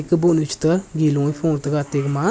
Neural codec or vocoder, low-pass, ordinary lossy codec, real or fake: none; none; none; real